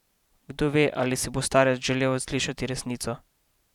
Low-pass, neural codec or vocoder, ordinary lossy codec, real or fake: 19.8 kHz; vocoder, 44.1 kHz, 128 mel bands every 512 samples, BigVGAN v2; none; fake